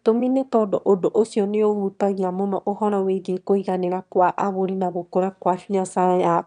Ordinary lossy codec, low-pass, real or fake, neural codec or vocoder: none; 9.9 kHz; fake; autoencoder, 22.05 kHz, a latent of 192 numbers a frame, VITS, trained on one speaker